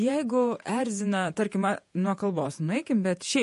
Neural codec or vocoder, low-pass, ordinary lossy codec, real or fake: vocoder, 48 kHz, 128 mel bands, Vocos; 14.4 kHz; MP3, 48 kbps; fake